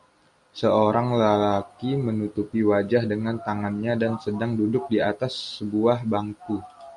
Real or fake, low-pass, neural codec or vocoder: real; 10.8 kHz; none